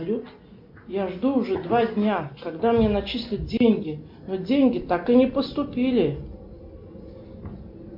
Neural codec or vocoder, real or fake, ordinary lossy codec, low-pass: none; real; MP3, 48 kbps; 5.4 kHz